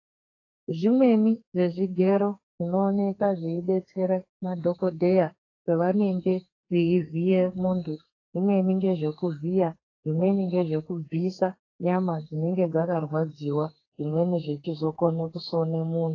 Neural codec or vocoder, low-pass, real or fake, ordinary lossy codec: codec, 32 kHz, 1.9 kbps, SNAC; 7.2 kHz; fake; AAC, 32 kbps